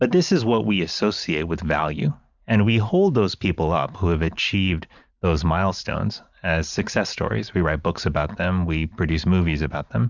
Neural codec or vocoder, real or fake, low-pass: vocoder, 44.1 kHz, 128 mel bands every 512 samples, BigVGAN v2; fake; 7.2 kHz